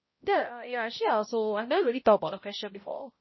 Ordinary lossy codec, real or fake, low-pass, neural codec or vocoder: MP3, 24 kbps; fake; 7.2 kHz; codec, 16 kHz, 0.5 kbps, X-Codec, HuBERT features, trained on balanced general audio